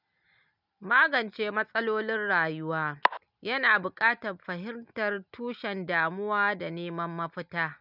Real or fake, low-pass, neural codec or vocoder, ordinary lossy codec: real; 5.4 kHz; none; none